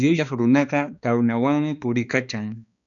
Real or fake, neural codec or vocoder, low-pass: fake; codec, 16 kHz, 2 kbps, X-Codec, HuBERT features, trained on balanced general audio; 7.2 kHz